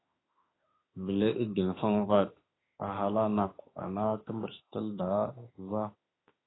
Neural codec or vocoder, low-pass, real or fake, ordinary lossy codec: autoencoder, 48 kHz, 32 numbers a frame, DAC-VAE, trained on Japanese speech; 7.2 kHz; fake; AAC, 16 kbps